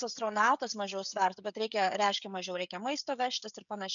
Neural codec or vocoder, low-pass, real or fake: codec, 16 kHz, 16 kbps, FreqCodec, smaller model; 7.2 kHz; fake